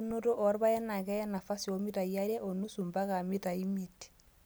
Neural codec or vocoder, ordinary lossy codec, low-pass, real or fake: none; none; none; real